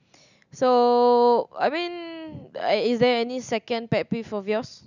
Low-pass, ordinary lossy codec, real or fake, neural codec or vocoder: 7.2 kHz; none; real; none